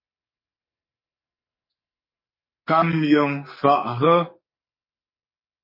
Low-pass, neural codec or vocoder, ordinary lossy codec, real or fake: 5.4 kHz; codec, 44.1 kHz, 2.6 kbps, SNAC; MP3, 24 kbps; fake